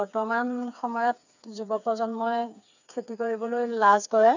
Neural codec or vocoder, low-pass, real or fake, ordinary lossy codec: codec, 16 kHz, 4 kbps, FreqCodec, smaller model; 7.2 kHz; fake; none